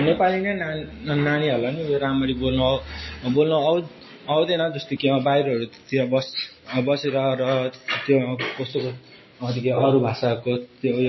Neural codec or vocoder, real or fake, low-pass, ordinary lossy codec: none; real; 7.2 kHz; MP3, 24 kbps